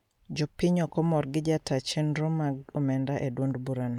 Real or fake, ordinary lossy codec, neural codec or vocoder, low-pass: real; none; none; 19.8 kHz